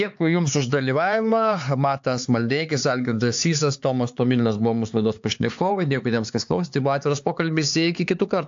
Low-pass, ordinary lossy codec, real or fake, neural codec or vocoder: 7.2 kHz; AAC, 64 kbps; fake; codec, 16 kHz, 4 kbps, X-Codec, HuBERT features, trained on LibriSpeech